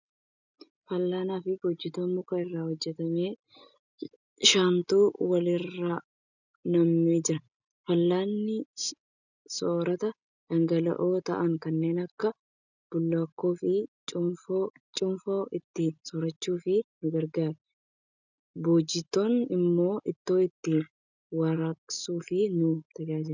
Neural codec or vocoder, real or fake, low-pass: none; real; 7.2 kHz